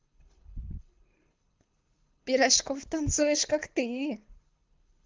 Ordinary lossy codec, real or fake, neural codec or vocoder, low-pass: Opus, 24 kbps; fake; codec, 24 kHz, 6 kbps, HILCodec; 7.2 kHz